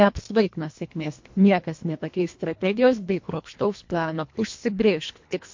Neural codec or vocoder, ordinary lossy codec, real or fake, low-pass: codec, 24 kHz, 1.5 kbps, HILCodec; MP3, 48 kbps; fake; 7.2 kHz